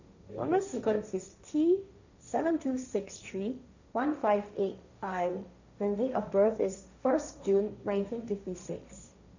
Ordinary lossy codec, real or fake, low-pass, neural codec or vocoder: none; fake; 7.2 kHz; codec, 16 kHz, 1.1 kbps, Voila-Tokenizer